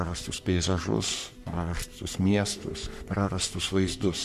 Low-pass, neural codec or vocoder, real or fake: 14.4 kHz; codec, 44.1 kHz, 3.4 kbps, Pupu-Codec; fake